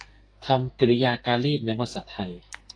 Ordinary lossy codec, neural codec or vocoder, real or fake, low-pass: AAC, 48 kbps; codec, 44.1 kHz, 2.6 kbps, SNAC; fake; 9.9 kHz